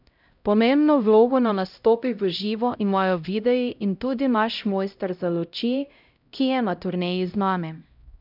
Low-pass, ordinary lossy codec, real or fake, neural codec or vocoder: 5.4 kHz; none; fake; codec, 16 kHz, 0.5 kbps, X-Codec, HuBERT features, trained on LibriSpeech